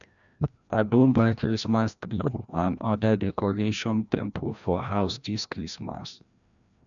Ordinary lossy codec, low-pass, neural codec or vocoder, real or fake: none; 7.2 kHz; codec, 16 kHz, 1 kbps, FreqCodec, larger model; fake